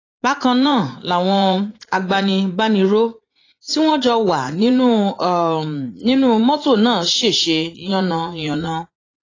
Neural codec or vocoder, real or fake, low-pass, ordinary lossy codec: vocoder, 44.1 kHz, 80 mel bands, Vocos; fake; 7.2 kHz; AAC, 32 kbps